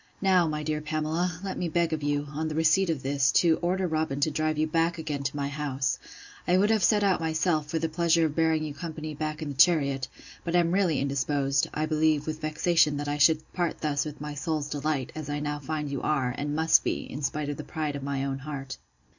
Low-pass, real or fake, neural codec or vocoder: 7.2 kHz; real; none